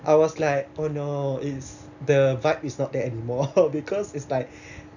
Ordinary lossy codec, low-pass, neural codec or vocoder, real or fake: none; 7.2 kHz; none; real